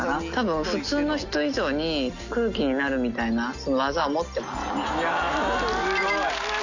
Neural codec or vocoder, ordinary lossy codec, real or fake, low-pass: none; none; real; 7.2 kHz